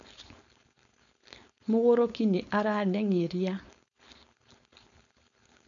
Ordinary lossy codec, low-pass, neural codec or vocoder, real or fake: none; 7.2 kHz; codec, 16 kHz, 4.8 kbps, FACodec; fake